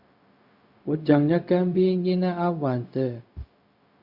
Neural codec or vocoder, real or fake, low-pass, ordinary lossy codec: codec, 16 kHz, 0.4 kbps, LongCat-Audio-Codec; fake; 5.4 kHz; Opus, 64 kbps